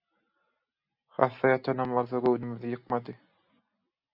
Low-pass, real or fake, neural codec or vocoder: 5.4 kHz; real; none